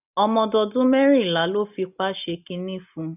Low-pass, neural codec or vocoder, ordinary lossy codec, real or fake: 3.6 kHz; none; none; real